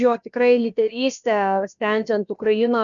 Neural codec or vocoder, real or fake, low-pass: codec, 16 kHz, about 1 kbps, DyCAST, with the encoder's durations; fake; 7.2 kHz